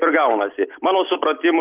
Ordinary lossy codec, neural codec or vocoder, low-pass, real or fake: Opus, 32 kbps; none; 3.6 kHz; real